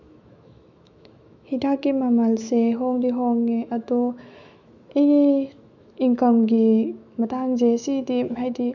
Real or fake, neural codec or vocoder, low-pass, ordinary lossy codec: fake; autoencoder, 48 kHz, 128 numbers a frame, DAC-VAE, trained on Japanese speech; 7.2 kHz; none